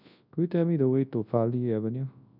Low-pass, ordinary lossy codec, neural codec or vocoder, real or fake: 5.4 kHz; none; codec, 24 kHz, 0.9 kbps, WavTokenizer, large speech release; fake